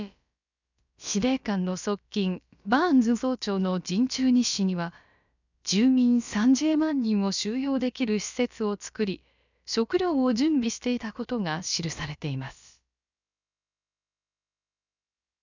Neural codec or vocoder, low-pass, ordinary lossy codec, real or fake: codec, 16 kHz, about 1 kbps, DyCAST, with the encoder's durations; 7.2 kHz; none; fake